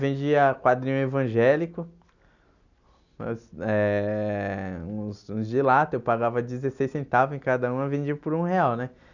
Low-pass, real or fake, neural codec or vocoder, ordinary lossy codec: 7.2 kHz; real; none; none